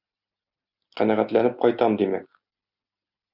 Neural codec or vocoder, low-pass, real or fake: none; 5.4 kHz; real